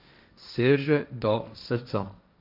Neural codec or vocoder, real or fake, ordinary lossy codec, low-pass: codec, 16 kHz, 1.1 kbps, Voila-Tokenizer; fake; none; 5.4 kHz